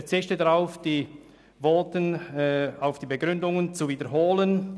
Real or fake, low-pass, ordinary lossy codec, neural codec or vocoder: real; none; none; none